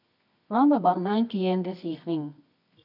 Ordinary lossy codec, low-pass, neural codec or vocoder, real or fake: none; 5.4 kHz; codec, 24 kHz, 0.9 kbps, WavTokenizer, medium music audio release; fake